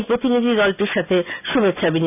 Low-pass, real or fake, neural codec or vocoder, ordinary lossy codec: 3.6 kHz; real; none; none